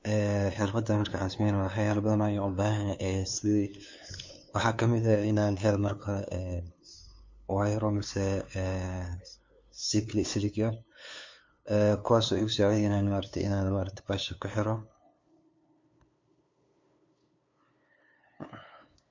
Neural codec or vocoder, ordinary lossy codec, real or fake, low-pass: codec, 16 kHz, 2 kbps, FunCodec, trained on LibriTTS, 25 frames a second; MP3, 48 kbps; fake; 7.2 kHz